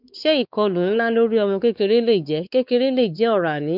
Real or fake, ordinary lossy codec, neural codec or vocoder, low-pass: fake; none; codec, 16 kHz, 4 kbps, X-Codec, WavLM features, trained on Multilingual LibriSpeech; 5.4 kHz